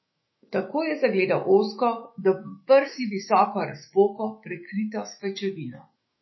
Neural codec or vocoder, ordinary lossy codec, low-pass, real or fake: autoencoder, 48 kHz, 128 numbers a frame, DAC-VAE, trained on Japanese speech; MP3, 24 kbps; 7.2 kHz; fake